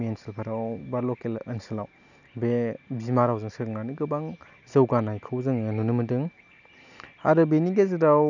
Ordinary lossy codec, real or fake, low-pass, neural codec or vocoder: none; real; 7.2 kHz; none